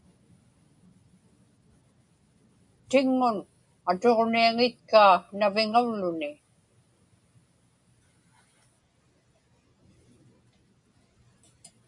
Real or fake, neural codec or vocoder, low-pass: real; none; 10.8 kHz